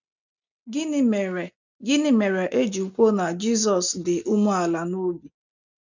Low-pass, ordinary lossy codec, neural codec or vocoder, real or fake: 7.2 kHz; none; none; real